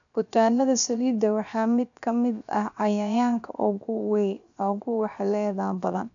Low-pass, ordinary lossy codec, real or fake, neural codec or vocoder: 7.2 kHz; none; fake; codec, 16 kHz, 0.7 kbps, FocalCodec